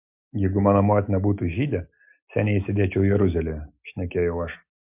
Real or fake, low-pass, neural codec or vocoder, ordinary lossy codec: real; 3.6 kHz; none; MP3, 32 kbps